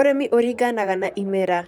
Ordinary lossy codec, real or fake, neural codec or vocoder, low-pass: none; fake; vocoder, 44.1 kHz, 128 mel bands, Pupu-Vocoder; 19.8 kHz